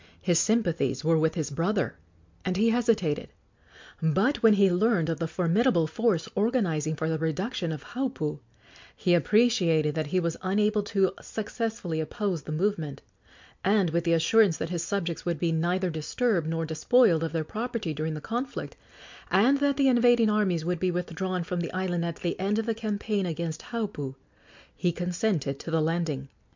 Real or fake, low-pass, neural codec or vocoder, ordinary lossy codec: real; 7.2 kHz; none; MP3, 64 kbps